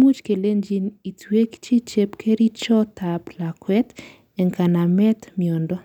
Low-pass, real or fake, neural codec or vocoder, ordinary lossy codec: 19.8 kHz; real; none; none